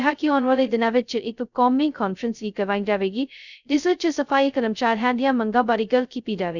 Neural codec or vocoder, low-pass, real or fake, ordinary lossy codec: codec, 16 kHz, 0.2 kbps, FocalCodec; 7.2 kHz; fake; none